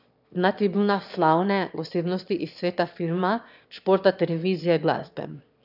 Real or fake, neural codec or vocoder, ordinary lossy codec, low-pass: fake; autoencoder, 22.05 kHz, a latent of 192 numbers a frame, VITS, trained on one speaker; none; 5.4 kHz